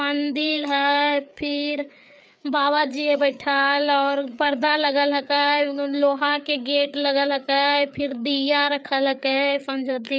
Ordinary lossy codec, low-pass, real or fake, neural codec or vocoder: none; none; fake; codec, 16 kHz, 4 kbps, FreqCodec, larger model